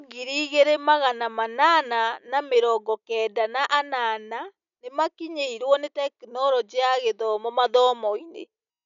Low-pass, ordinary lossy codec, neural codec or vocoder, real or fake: 7.2 kHz; none; none; real